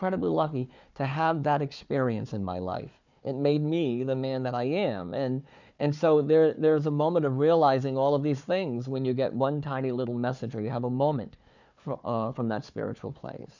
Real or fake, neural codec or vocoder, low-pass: fake; codec, 16 kHz, 4 kbps, FunCodec, trained on Chinese and English, 50 frames a second; 7.2 kHz